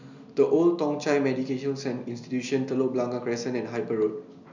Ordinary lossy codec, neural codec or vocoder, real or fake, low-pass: none; none; real; 7.2 kHz